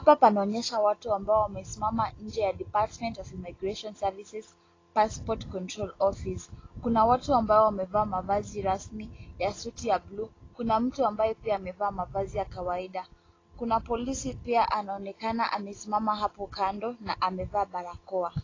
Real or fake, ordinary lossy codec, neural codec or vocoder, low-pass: real; AAC, 32 kbps; none; 7.2 kHz